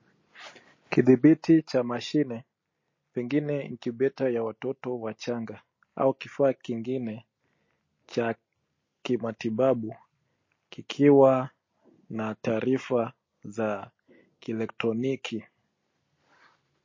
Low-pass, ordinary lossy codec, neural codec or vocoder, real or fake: 7.2 kHz; MP3, 32 kbps; none; real